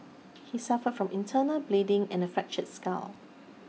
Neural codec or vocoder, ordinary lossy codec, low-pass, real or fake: none; none; none; real